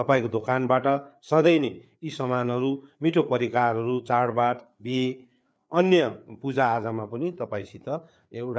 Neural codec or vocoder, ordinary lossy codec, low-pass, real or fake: codec, 16 kHz, 4 kbps, FreqCodec, larger model; none; none; fake